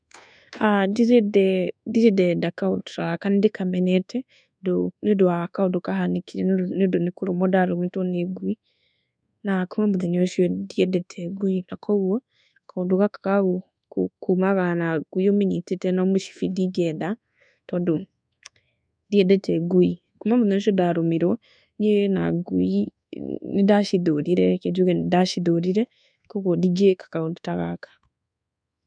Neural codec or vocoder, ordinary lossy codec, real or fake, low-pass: codec, 24 kHz, 1.2 kbps, DualCodec; none; fake; 9.9 kHz